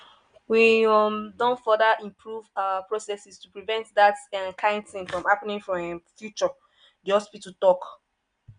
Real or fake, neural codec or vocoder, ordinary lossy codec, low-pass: real; none; none; 9.9 kHz